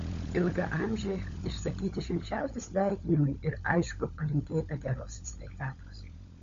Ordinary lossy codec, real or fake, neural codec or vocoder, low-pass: MP3, 48 kbps; fake; codec, 16 kHz, 16 kbps, FunCodec, trained on LibriTTS, 50 frames a second; 7.2 kHz